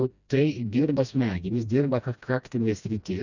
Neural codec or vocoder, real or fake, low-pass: codec, 16 kHz, 1 kbps, FreqCodec, smaller model; fake; 7.2 kHz